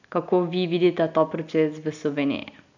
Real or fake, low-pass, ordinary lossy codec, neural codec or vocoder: real; 7.2 kHz; none; none